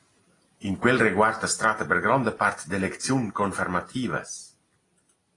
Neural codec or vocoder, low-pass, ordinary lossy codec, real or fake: none; 10.8 kHz; AAC, 32 kbps; real